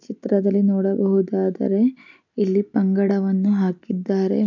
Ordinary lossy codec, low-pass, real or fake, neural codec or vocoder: none; 7.2 kHz; real; none